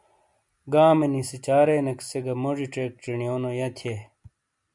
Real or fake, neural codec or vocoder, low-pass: real; none; 10.8 kHz